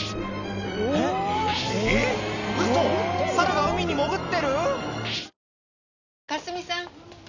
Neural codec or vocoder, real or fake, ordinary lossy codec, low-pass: none; real; none; 7.2 kHz